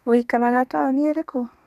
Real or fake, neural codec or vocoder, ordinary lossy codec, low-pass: fake; codec, 32 kHz, 1.9 kbps, SNAC; none; 14.4 kHz